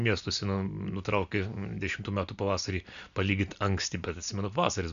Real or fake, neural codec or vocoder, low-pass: real; none; 7.2 kHz